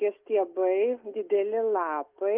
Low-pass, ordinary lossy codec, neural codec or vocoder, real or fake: 3.6 kHz; Opus, 24 kbps; none; real